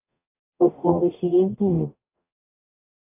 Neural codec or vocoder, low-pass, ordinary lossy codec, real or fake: codec, 44.1 kHz, 0.9 kbps, DAC; 3.6 kHz; AAC, 32 kbps; fake